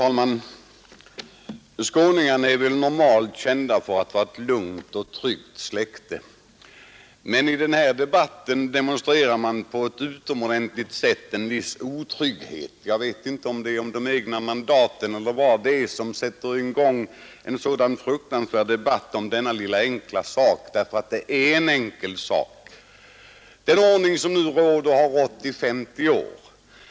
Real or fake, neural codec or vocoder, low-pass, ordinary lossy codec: real; none; none; none